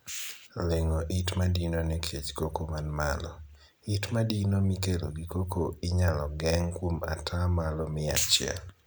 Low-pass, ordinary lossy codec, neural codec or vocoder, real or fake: none; none; none; real